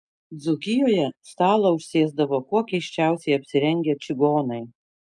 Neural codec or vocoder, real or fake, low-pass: none; real; 10.8 kHz